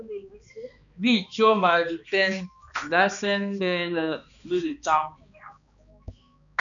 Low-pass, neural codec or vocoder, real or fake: 7.2 kHz; codec, 16 kHz, 2 kbps, X-Codec, HuBERT features, trained on balanced general audio; fake